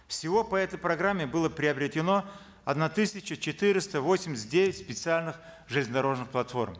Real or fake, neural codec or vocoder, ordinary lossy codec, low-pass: real; none; none; none